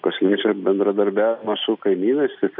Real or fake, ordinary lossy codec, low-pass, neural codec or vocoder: real; MP3, 48 kbps; 5.4 kHz; none